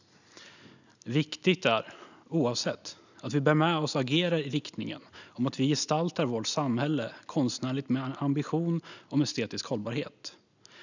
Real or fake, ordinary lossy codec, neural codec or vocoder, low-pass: real; none; none; 7.2 kHz